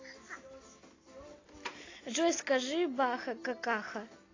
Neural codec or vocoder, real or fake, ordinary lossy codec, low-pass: none; real; AAC, 32 kbps; 7.2 kHz